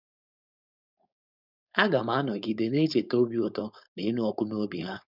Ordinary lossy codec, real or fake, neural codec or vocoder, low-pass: none; fake; codec, 16 kHz, 4.8 kbps, FACodec; 5.4 kHz